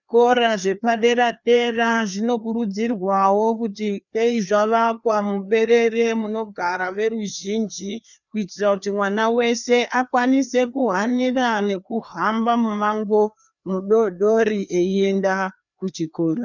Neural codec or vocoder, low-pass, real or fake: codec, 16 kHz, 2 kbps, FreqCodec, larger model; 7.2 kHz; fake